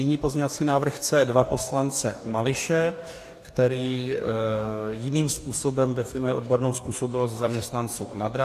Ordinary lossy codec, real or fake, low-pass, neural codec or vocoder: AAC, 64 kbps; fake; 14.4 kHz; codec, 44.1 kHz, 2.6 kbps, DAC